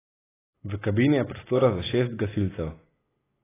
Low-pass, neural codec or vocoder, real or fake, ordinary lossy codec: 3.6 kHz; none; real; AAC, 16 kbps